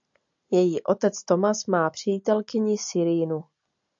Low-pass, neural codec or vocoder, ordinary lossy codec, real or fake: 7.2 kHz; none; AAC, 64 kbps; real